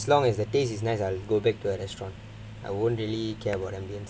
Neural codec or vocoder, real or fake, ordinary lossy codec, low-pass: none; real; none; none